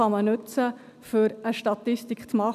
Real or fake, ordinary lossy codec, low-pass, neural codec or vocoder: real; none; 14.4 kHz; none